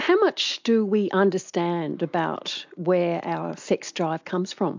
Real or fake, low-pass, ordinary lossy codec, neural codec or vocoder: real; 7.2 kHz; MP3, 64 kbps; none